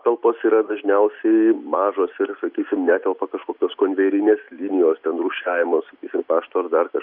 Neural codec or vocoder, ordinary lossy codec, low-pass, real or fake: none; Opus, 64 kbps; 5.4 kHz; real